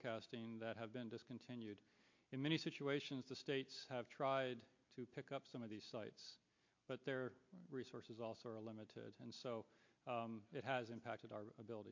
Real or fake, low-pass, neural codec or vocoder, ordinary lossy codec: real; 7.2 kHz; none; MP3, 48 kbps